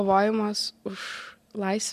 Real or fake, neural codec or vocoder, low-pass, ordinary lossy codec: real; none; 14.4 kHz; MP3, 64 kbps